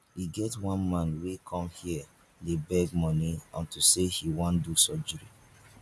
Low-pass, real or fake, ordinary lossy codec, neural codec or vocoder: none; real; none; none